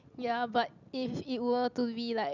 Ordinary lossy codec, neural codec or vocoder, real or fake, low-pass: none; none; real; 7.2 kHz